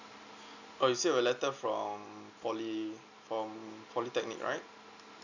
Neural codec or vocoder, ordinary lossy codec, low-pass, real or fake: none; none; 7.2 kHz; real